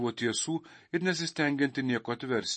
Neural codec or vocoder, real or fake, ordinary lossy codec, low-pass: none; real; MP3, 32 kbps; 10.8 kHz